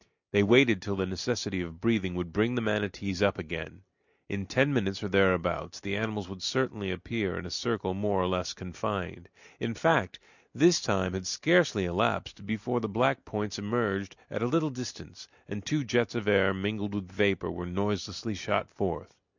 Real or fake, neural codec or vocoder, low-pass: real; none; 7.2 kHz